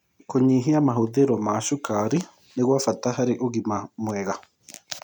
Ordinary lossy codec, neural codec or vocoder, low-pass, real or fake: none; none; 19.8 kHz; real